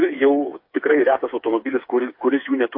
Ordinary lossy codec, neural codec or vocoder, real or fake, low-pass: MP3, 32 kbps; codec, 16 kHz, 4 kbps, FreqCodec, smaller model; fake; 5.4 kHz